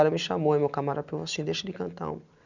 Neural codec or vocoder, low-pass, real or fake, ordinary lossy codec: none; 7.2 kHz; real; none